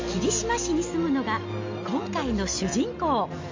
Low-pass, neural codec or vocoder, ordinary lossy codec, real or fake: 7.2 kHz; none; MP3, 48 kbps; real